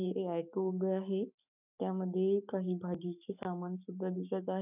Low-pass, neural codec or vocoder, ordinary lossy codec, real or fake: 3.6 kHz; codec, 44.1 kHz, 7.8 kbps, Pupu-Codec; none; fake